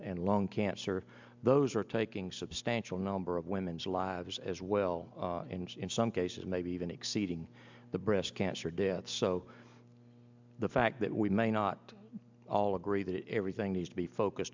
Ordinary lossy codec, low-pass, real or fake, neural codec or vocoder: MP3, 64 kbps; 7.2 kHz; real; none